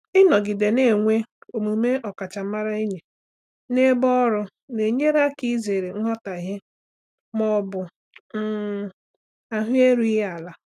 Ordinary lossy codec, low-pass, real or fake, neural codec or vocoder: none; 14.4 kHz; real; none